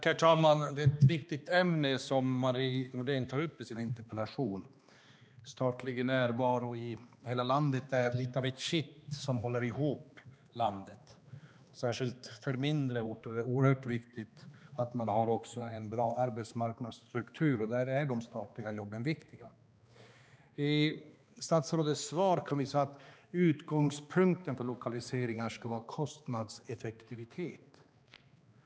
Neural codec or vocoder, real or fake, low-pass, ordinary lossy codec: codec, 16 kHz, 2 kbps, X-Codec, HuBERT features, trained on balanced general audio; fake; none; none